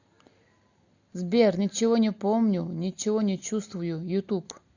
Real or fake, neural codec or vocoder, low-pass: real; none; 7.2 kHz